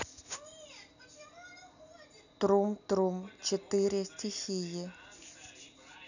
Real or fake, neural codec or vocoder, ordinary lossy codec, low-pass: real; none; none; 7.2 kHz